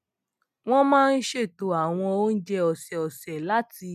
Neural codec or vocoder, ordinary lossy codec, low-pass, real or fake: none; none; 14.4 kHz; real